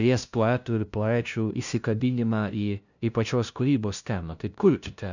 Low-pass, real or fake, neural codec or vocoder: 7.2 kHz; fake; codec, 16 kHz, 0.5 kbps, FunCodec, trained on LibriTTS, 25 frames a second